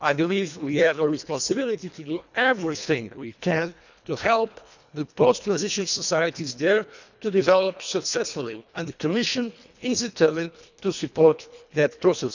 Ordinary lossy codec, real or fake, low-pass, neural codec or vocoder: none; fake; 7.2 kHz; codec, 24 kHz, 1.5 kbps, HILCodec